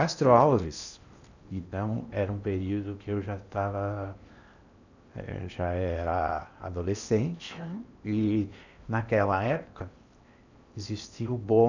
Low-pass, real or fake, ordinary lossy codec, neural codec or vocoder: 7.2 kHz; fake; none; codec, 16 kHz in and 24 kHz out, 0.8 kbps, FocalCodec, streaming, 65536 codes